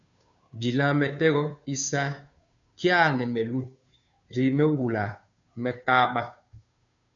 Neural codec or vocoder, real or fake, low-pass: codec, 16 kHz, 2 kbps, FunCodec, trained on Chinese and English, 25 frames a second; fake; 7.2 kHz